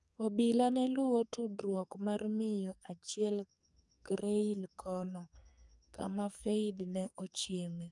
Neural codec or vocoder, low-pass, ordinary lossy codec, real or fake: codec, 44.1 kHz, 2.6 kbps, SNAC; 10.8 kHz; none; fake